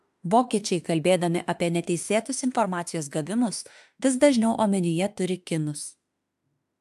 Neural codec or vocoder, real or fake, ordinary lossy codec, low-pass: autoencoder, 48 kHz, 32 numbers a frame, DAC-VAE, trained on Japanese speech; fake; AAC, 96 kbps; 14.4 kHz